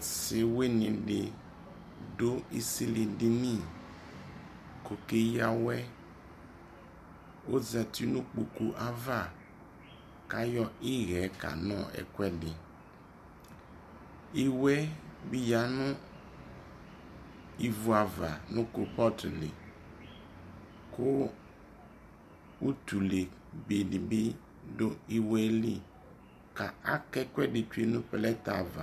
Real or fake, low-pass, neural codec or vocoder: real; 14.4 kHz; none